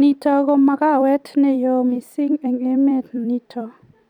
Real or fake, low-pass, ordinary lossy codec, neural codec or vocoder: fake; 19.8 kHz; none; vocoder, 44.1 kHz, 128 mel bands every 256 samples, BigVGAN v2